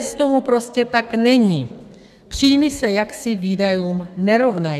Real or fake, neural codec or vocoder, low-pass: fake; codec, 32 kHz, 1.9 kbps, SNAC; 14.4 kHz